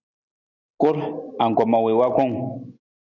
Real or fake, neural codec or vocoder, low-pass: real; none; 7.2 kHz